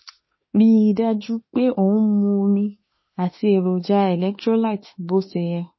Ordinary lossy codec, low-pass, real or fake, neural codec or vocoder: MP3, 24 kbps; 7.2 kHz; fake; autoencoder, 48 kHz, 32 numbers a frame, DAC-VAE, trained on Japanese speech